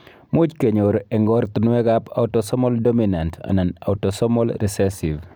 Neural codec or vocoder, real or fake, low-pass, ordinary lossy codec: none; real; none; none